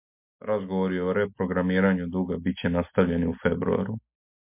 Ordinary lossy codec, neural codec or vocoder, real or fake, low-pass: MP3, 32 kbps; none; real; 3.6 kHz